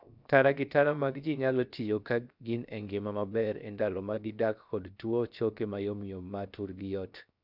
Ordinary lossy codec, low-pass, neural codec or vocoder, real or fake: none; 5.4 kHz; codec, 16 kHz, 0.7 kbps, FocalCodec; fake